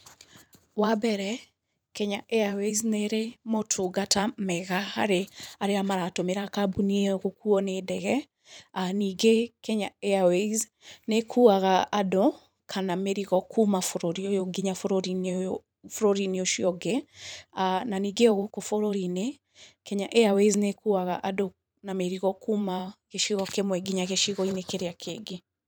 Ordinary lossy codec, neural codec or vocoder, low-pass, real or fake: none; vocoder, 44.1 kHz, 128 mel bands every 512 samples, BigVGAN v2; none; fake